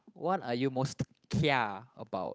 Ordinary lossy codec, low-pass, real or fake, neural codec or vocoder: none; none; fake; codec, 16 kHz, 8 kbps, FunCodec, trained on Chinese and English, 25 frames a second